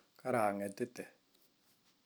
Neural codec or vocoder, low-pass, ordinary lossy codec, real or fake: none; none; none; real